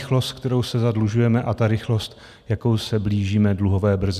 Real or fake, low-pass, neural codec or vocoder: real; 14.4 kHz; none